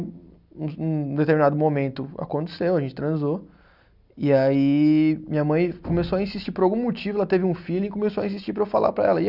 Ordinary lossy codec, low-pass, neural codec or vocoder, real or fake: none; 5.4 kHz; none; real